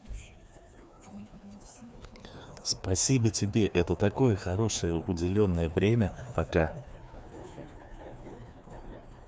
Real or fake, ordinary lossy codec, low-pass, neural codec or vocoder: fake; none; none; codec, 16 kHz, 2 kbps, FreqCodec, larger model